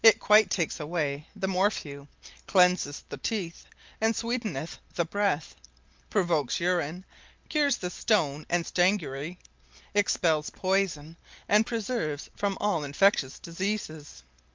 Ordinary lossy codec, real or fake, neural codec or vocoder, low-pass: Opus, 32 kbps; real; none; 7.2 kHz